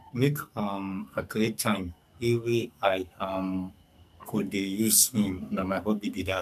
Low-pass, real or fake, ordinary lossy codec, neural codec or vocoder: 14.4 kHz; fake; none; codec, 44.1 kHz, 2.6 kbps, SNAC